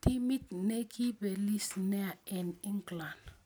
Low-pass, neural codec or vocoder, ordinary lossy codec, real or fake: none; none; none; real